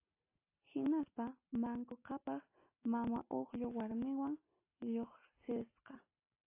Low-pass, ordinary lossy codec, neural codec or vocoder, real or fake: 3.6 kHz; AAC, 24 kbps; none; real